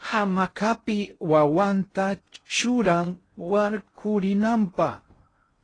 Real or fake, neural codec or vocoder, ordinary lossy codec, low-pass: fake; codec, 16 kHz in and 24 kHz out, 0.6 kbps, FocalCodec, streaming, 2048 codes; AAC, 32 kbps; 9.9 kHz